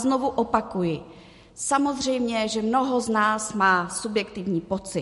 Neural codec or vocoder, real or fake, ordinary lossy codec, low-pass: none; real; MP3, 48 kbps; 14.4 kHz